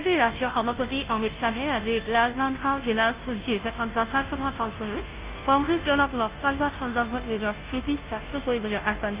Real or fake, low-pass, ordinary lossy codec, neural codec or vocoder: fake; 3.6 kHz; Opus, 32 kbps; codec, 16 kHz, 0.5 kbps, FunCodec, trained on Chinese and English, 25 frames a second